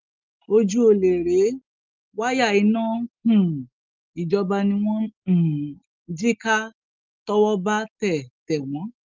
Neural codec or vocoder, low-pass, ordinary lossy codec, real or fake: none; 7.2 kHz; Opus, 32 kbps; real